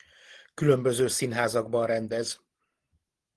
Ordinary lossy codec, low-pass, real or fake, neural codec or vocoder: Opus, 16 kbps; 10.8 kHz; real; none